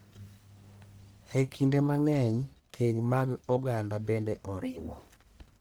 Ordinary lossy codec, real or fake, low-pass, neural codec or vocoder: none; fake; none; codec, 44.1 kHz, 1.7 kbps, Pupu-Codec